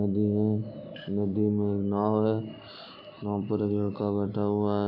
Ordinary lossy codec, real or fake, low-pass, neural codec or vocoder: AAC, 32 kbps; real; 5.4 kHz; none